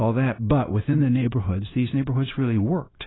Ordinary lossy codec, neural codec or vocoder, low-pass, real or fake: AAC, 16 kbps; codec, 16 kHz, 0.9 kbps, LongCat-Audio-Codec; 7.2 kHz; fake